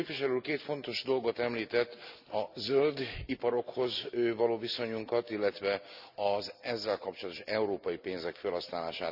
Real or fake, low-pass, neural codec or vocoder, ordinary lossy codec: real; 5.4 kHz; none; none